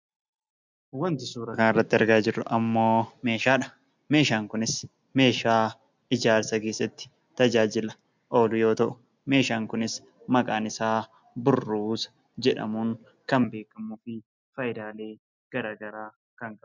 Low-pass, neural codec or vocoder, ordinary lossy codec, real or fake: 7.2 kHz; none; MP3, 64 kbps; real